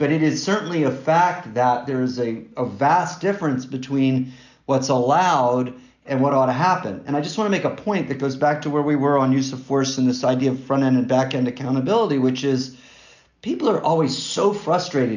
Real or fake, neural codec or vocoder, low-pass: real; none; 7.2 kHz